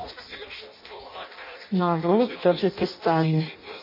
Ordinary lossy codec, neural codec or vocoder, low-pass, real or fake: MP3, 24 kbps; codec, 16 kHz in and 24 kHz out, 0.6 kbps, FireRedTTS-2 codec; 5.4 kHz; fake